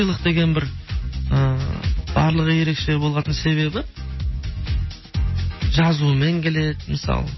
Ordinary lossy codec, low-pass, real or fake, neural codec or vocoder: MP3, 24 kbps; 7.2 kHz; real; none